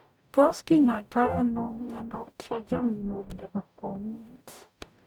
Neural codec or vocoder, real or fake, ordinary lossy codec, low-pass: codec, 44.1 kHz, 0.9 kbps, DAC; fake; none; none